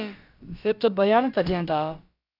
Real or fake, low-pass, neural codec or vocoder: fake; 5.4 kHz; codec, 16 kHz, about 1 kbps, DyCAST, with the encoder's durations